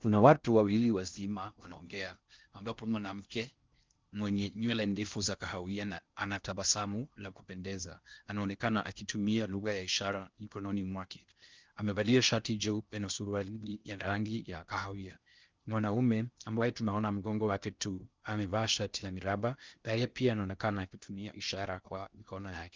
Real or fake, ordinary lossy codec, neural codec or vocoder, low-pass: fake; Opus, 24 kbps; codec, 16 kHz in and 24 kHz out, 0.6 kbps, FocalCodec, streaming, 4096 codes; 7.2 kHz